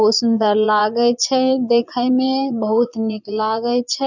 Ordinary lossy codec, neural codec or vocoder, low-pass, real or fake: none; vocoder, 22.05 kHz, 80 mel bands, Vocos; 7.2 kHz; fake